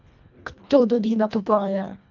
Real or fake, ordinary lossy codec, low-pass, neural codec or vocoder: fake; none; 7.2 kHz; codec, 24 kHz, 1.5 kbps, HILCodec